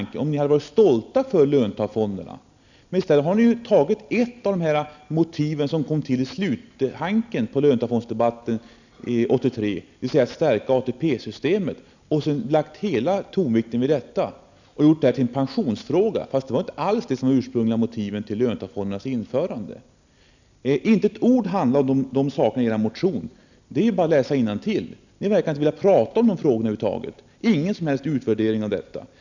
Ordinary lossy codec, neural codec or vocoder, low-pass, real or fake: none; none; 7.2 kHz; real